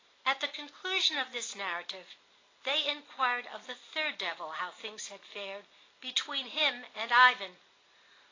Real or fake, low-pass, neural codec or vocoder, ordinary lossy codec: real; 7.2 kHz; none; AAC, 32 kbps